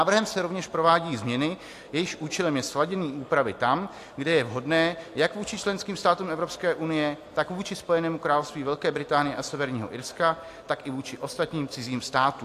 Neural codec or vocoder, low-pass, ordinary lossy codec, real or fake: none; 14.4 kHz; AAC, 64 kbps; real